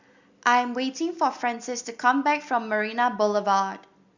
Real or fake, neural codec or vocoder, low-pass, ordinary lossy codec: real; none; 7.2 kHz; Opus, 64 kbps